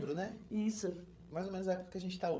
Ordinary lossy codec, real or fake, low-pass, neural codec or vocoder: none; fake; none; codec, 16 kHz, 8 kbps, FreqCodec, larger model